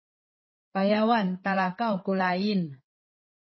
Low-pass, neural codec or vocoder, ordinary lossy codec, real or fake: 7.2 kHz; codec, 16 kHz, 8 kbps, FreqCodec, larger model; MP3, 24 kbps; fake